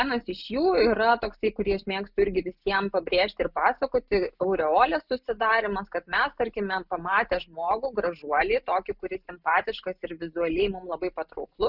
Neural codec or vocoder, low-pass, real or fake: none; 5.4 kHz; real